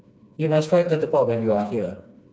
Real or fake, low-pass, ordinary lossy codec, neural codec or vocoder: fake; none; none; codec, 16 kHz, 2 kbps, FreqCodec, smaller model